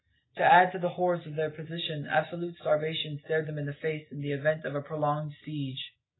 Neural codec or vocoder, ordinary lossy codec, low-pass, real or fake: none; AAC, 16 kbps; 7.2 kHz; real